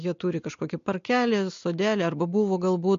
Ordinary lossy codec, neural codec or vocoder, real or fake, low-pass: MP3, 48 kbps; none; real; 7.2 kHz